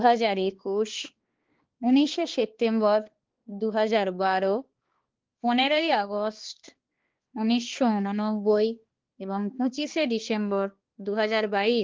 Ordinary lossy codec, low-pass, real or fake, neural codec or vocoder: Opus, 16 kbps; 7.2 kHz; fake; codec, 16 kHz, 2 kbps, X-Codec, HuBERT features, trained on balanced general audio